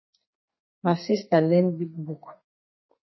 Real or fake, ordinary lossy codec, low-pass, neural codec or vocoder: fake; MP3, 24 kbps; 7.2 kHz; codec, 32 kHz, 1.9 kbps, SNAC